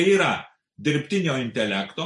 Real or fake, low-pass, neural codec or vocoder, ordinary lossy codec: real; 10.8 kHz; none; MP3, 48 kbps